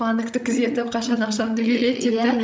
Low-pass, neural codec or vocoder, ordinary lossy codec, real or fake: none; codec, 16 kHz, 16 kbps, FreqCodec, larger model; none; fake